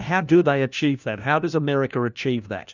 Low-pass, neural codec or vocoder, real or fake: 7.2 kHz; codec, 16 kHz, 1 kbps, FunCodec, trained on LibriTTS, 50 frames a second; fake